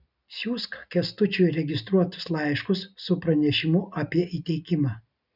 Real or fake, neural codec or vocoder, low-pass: real; none; 5.4 kHz